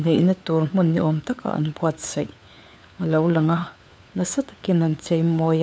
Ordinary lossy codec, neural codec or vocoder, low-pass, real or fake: none; codec, 16 kHz, 4 kbps, FunCodec, trained on LibriTTS, 50 frames a second; none; fake